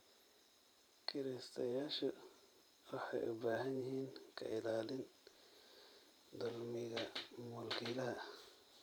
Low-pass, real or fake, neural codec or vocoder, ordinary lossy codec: none; fake; vocoder, 44.1 kHz, 128 mel bands every 512 samples, BigVGAN v2; none